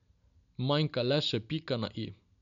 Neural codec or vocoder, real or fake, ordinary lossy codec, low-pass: none; real; none; 7.2 kHz